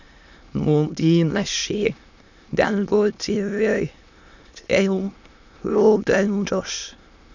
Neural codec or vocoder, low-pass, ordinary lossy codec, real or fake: autoencoder, 22.05 kHz, a latent of 192 numbers a frame, VITS, trained on many speakers; 7.2 kHz; none; fake